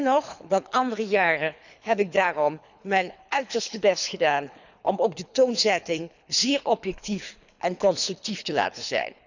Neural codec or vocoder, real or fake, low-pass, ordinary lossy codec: codec, 24 kHz, 3 kbps, HILCodec; fake; 7.2 kHz; none